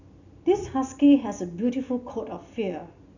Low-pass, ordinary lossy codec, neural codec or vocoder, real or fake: 7.2 kHz; none; none; real